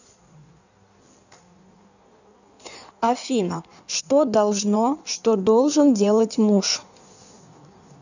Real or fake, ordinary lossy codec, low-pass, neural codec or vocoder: fake; none; 7.2 kHz; codec, 16 kHz in and 24 kHz out, 1.1 kbps, FireRedTTS-2 codec